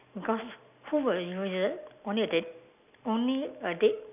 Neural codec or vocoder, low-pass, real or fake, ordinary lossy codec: none; 3.6 kHz; real; none